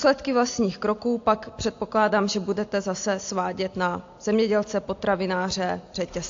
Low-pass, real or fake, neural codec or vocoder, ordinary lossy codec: 7.2 kHz; real; none; AAC, 48 kbps